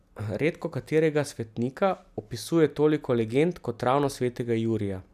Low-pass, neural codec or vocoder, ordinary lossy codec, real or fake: 14.4 kHz; none; none; real